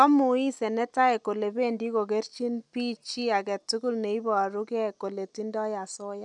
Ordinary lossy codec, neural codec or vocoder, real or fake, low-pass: none; none; real; 10.8 kHz